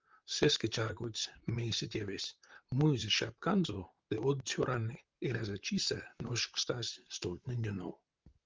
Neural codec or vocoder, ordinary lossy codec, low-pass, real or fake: vocoder, 44.1 kHz, 128 mel bands, Pupu-Vocoder; Opus, 32 kbps; 7.2 kHz; fake